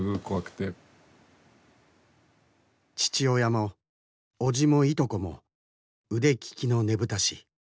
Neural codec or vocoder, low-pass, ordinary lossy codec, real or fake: none; none; none; real